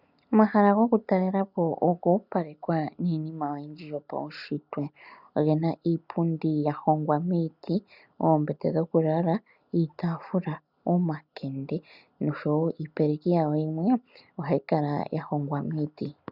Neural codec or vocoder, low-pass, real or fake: none; 5.4 kHz; real